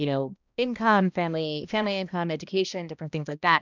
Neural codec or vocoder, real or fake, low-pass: codec, 16 kHz, 1 kbps, X-Codec, HuBERT features, trained on balanced general audio; fake; 7.2 kHz